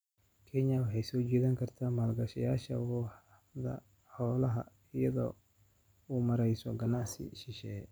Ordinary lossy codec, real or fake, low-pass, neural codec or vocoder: none; real; none; none